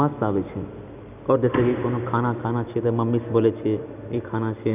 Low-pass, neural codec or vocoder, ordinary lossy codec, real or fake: 3.6 kHz; none; none; real